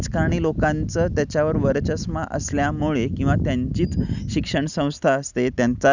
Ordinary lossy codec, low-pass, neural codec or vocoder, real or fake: none; 7.2 kHz; none; real